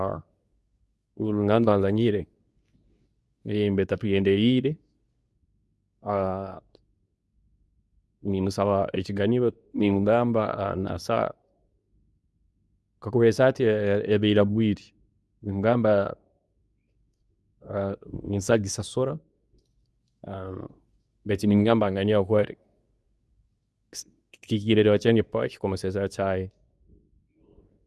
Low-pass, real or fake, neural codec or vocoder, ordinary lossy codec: none; fake; codec, 24 kHz, 0.9 kbps, WavTokenizer, medium speech release version 2; none